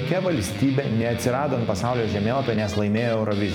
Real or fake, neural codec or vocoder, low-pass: fake; vocoder, 44.1 kHz, 128 mel bands every 256 samples, BigVGAN v2; 19.8 kHz